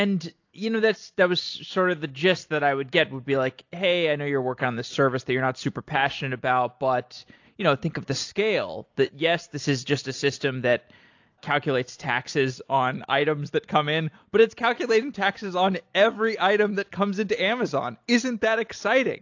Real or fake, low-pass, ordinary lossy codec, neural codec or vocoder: real; 7.2 kHz; AAC, 48 kbps; none